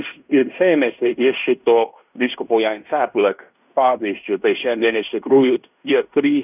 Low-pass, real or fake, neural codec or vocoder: 3.6 kHz; fake; codec, 16 kHz in and 24 kHz out, 0.9 kbps, LongCat-Audio-Codec, fine tuned four codebook decoder